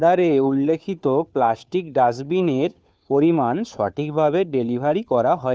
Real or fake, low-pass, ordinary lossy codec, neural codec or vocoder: fake; none; none; codec, 16 kHz, 2 kbps, FunCodec, trained on Chinese and English, 25 frames a second